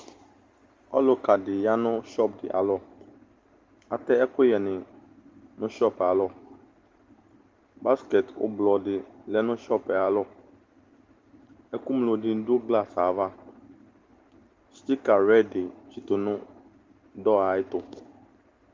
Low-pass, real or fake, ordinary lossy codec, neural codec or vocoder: 7.2 kHz; real; Opus, 32 kbps; none